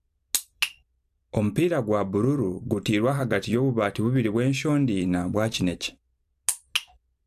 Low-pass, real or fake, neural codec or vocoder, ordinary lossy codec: 14.4 kHz; fake; vocoder, 44.1 kHz, 128 mel bands every 256 samples, BigVGAN v2; AAC, 96 kbps